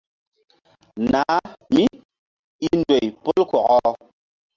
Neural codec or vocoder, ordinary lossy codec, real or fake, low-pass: none; Opus, 32 kbps; real; 7.2 kHz